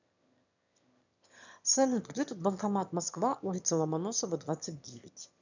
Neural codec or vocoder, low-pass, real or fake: autoencoder, 22.05 kHz, a latent of 192 numbers a frame, VITS, trained on one speaker; 7.2 kHz; fake